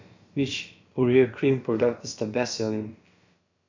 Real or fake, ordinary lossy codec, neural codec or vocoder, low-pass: fake; MP3, 48 kbps; codec, 16 kHz, about 1 kbps, DyCAST, with the encoder's durations; 7.2 kHz